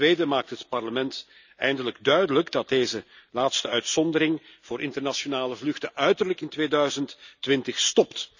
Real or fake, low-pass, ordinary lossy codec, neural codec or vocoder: real; 7.2 kHz; none; none